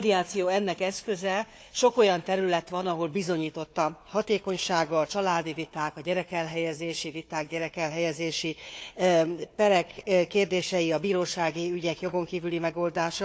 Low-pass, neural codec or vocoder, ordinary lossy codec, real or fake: none; codec, 16 kHz, 4 kbps, FunCodec, trained on Chinese and English, 50 frames a second; none; fake